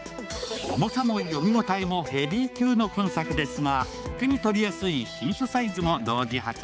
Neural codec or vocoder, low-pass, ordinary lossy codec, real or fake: codec, 16 kHz, 4 kbps, X-Codec, HuBERT features, trained on balanced general audio; none; none; fake